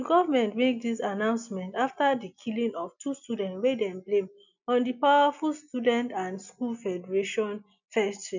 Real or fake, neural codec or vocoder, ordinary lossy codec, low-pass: real; none; none; 7.2 kHz